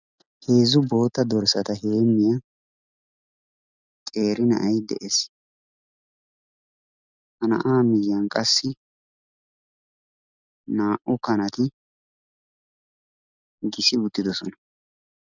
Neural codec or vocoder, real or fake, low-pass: none; real; 7.2 kHz